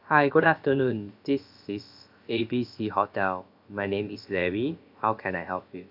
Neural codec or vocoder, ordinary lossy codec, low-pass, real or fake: codec, 16 kHz, about 1 kbps, DyCAST, with the encoder's durations; none; 5.4 kHz; fake